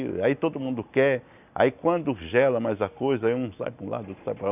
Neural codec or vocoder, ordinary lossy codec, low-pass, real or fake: none; none; 3.6 kHz; real